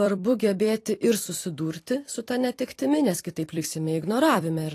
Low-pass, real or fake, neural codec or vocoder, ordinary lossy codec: 14.4 kHz; fake; vocoder, 44.1 kHz, 128 mel bands every 256 samples, BigVGAN v2; AAC, 48 kbps